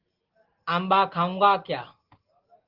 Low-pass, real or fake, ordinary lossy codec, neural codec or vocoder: 5.4 kHz; real; Opus, 16 kbps; none